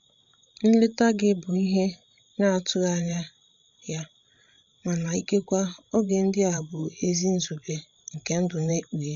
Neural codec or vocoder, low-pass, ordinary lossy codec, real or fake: codec, 16 kHz, 16 kbps, FreqCodec, larger model; 7.2 kHz; none; fake